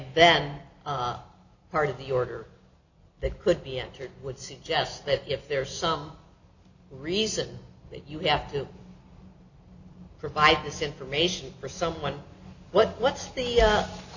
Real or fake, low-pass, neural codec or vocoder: real; 7.2 kHz; none